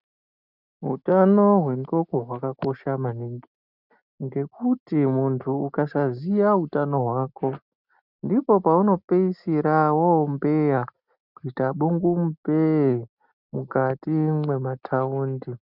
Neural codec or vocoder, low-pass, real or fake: none; 5.4 kHz; real